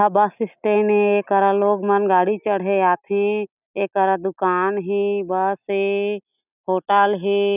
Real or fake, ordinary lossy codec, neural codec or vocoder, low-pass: real; none; none; 3.6 kHz